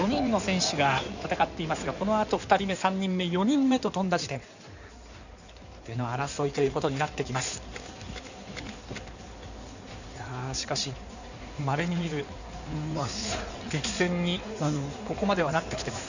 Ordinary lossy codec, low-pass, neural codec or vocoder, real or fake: none; 7.2 kHz; codec, 16 kHz in and 24 kHz out, 2.2 kbps, FireRedTTS-2 codec; fake